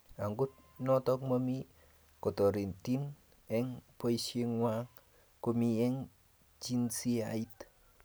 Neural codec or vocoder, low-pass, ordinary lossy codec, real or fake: none; none; none; real